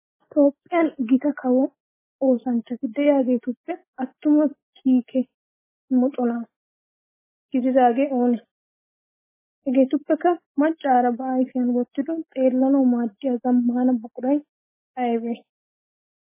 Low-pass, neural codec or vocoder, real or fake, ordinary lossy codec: 3.6 kHz; none; real; MP3, 16 kbps